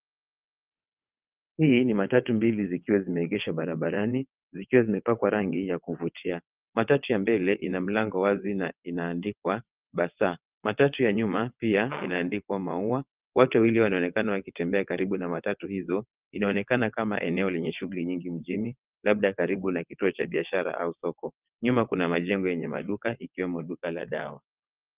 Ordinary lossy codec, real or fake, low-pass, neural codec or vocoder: Opus, 24 kbps; fake; 3.6 kHz; vocoder, 22.05 kHz, 80 mel bands, WaveNeXt